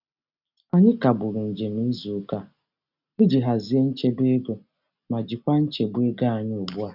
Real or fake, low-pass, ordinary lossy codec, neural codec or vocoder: real; 5.4 kHz; none; none